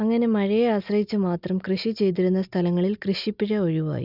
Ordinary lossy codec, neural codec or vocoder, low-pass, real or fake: none; none; 5.4 kHz; real